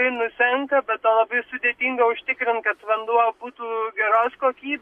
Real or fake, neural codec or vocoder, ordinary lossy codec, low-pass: real; none; Opus, 64 kbps; 14.4 kHz